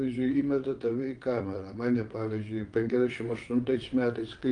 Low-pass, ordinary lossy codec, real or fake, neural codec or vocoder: 9.9 kHz; Opus, 32 kbps; fake; vocoder, 22.05 kHz, 80 mel bands, WaveNeXt